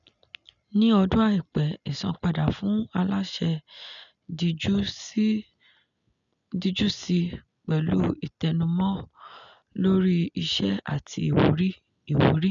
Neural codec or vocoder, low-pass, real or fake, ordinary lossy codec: none; 7.2 kHz; real; none